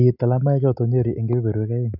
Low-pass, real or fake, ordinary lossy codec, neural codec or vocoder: 5.4 kHz; real; none; none